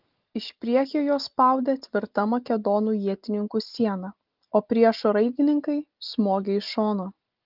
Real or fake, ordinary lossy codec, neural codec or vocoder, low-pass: real; Opus, 32 kbps; none; 5.4 kHz